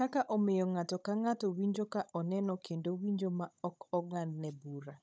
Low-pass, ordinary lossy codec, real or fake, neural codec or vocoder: none; none; fake; codec, 16 kHz, 16 kbps, FunCodec, trained on Chinese and English, 50 frames a second